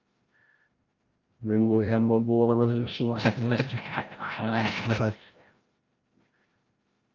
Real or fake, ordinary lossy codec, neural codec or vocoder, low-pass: fake; Opus, 24 kbps; codec, 16 kHz, 0.5 kbps, FreqCodec, larger model; 7.2 kHz